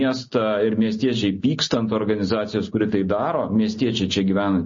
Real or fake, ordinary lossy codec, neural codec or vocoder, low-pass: real; MP3, 32 kbps; none; 7.2 kHz